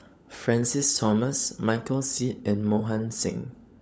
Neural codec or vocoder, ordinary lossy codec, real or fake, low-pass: codec, 16 kHz, 16 kbps, FunCodec, trained on LibriTTS, 50 frames a second; none; fake; none